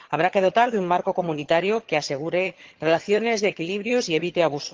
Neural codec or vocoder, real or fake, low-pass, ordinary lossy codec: vocoder, 22.05 kHz, 80 mel bands, HiFi-GAN; fake; 7.2 kHz; Opus, 16 kbps